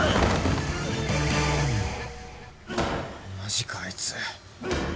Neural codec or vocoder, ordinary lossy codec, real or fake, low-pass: none; none; real; none